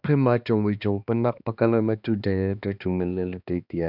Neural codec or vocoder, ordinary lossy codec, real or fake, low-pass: codec, 16 kHz, 2 kbps, X-Codec, HuBERT features, trained on balanced general audio; none; fake; 5.4 kHz